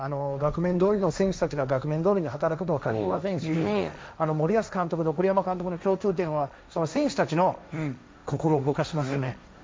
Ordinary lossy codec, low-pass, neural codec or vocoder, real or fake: none; none; codec, 16 kHz, 1.1 kbps, Voila-Tokenizer; fake